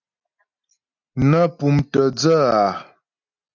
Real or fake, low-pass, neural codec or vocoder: real; 7.2 kHz; none